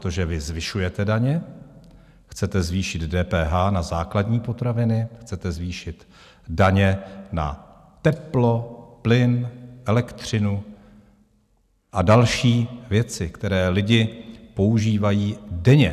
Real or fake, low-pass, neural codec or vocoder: real; 14.4 kHz; none